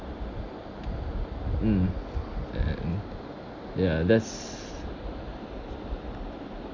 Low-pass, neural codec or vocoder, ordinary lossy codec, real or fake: 7.2 kHz; none; Opus, 64 kbps; real